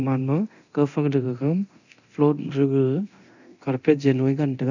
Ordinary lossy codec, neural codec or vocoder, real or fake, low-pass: none; codec, 24 kHz, 0.9 kbps, DualCodec; fake; 7.2 kHz